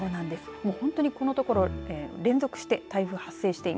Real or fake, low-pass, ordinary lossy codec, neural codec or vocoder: real; none; none; none